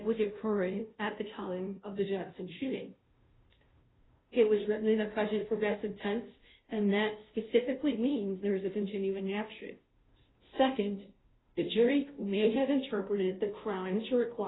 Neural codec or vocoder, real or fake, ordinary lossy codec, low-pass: codec, 16 kHz, 0.5 kbps, FunCodec, trained on Chinese and English, 25 frames a second; fake; AAC, 16 kbps; 7.2 kHz